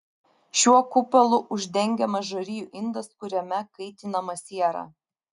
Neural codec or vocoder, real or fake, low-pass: none; real; 10.8 kHz